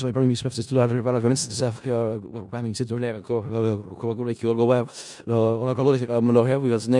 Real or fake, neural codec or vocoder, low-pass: fake; codec, 16 kHz in and 24 kHz out, 0.4 kbps, LongCat-Audio-Codec, four codebook decoder; 10.8 kHz